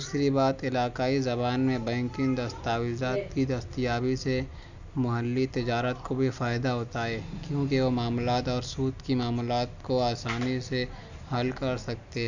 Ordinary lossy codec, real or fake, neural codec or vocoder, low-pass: none; real; none; 7.2 kHz